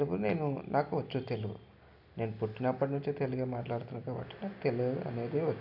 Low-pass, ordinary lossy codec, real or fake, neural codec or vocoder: 5.4 kHz; none; real; none